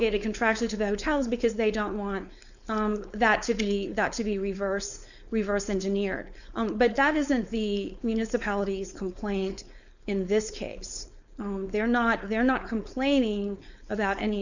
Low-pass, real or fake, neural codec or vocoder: 7.2 kHz; fake; codec, 16 kHz, 4.8 kbps, FACodec